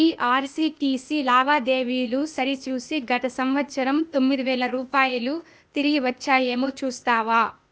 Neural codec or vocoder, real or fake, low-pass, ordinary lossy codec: codec, 16 kHz, 0.8 kbps, ZipCodec; fake; none; none